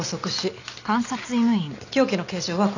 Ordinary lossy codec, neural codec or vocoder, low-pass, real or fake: none; none; 7.2 kHz; real